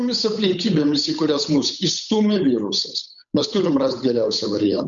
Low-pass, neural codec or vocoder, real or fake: 7.2 kHz; codec, 16 kHz, 8 kbps, FunCodec, trained on Chinese and English, 25 frames a second; fake